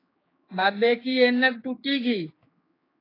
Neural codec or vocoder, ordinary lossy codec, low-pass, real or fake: codec, 16 kHz, 4 kbps, X-Codec, HuBERT features, trained on general audio; AAC, 24 kbps; 5.4 kHz; fake